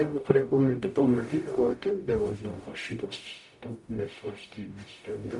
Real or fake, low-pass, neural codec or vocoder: fake; 10.8 kHz; codec, 44.1 kHz, 0.9 kbps, DAC